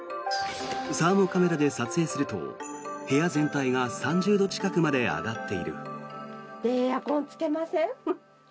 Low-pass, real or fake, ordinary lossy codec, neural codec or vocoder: none; real; none; none